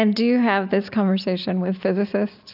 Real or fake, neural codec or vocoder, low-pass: real; none; 5.4 kHz